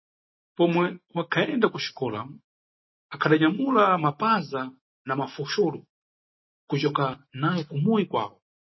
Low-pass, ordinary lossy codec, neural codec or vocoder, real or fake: 7.2 kHz; MP3, 24 kbps; none; real